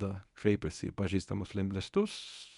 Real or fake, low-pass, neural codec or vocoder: fake; 10.8 kHz; codec, 24 kHz, 0.9 kbps, WavTokenizer, medium speech release version 1